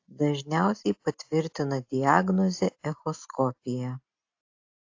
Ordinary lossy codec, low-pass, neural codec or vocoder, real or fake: AAC, 48 kbps; 7.2 kHz; none; real